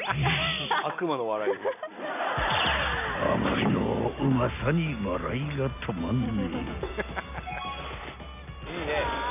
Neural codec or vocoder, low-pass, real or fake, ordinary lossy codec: none; 3.6 kHz; real; none